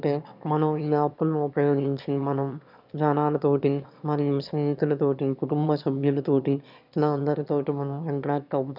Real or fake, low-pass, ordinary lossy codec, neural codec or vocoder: fake; 5.4 kHz; none; autoencoder, 22.05 kHz, a latent of 192 numbers a frame, VITS, trained on one speaker